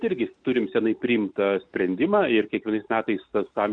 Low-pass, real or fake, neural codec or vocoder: 9.9 kHz; real; none